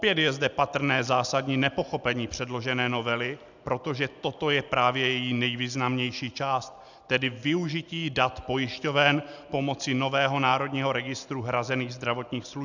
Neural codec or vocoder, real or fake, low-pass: none; real; 7.2 kHz